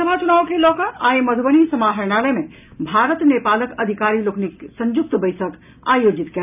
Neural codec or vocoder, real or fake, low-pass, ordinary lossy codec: none; real; 3.6 kHz; none